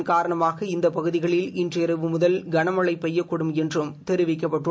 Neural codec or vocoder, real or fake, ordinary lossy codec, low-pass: none; real; none; none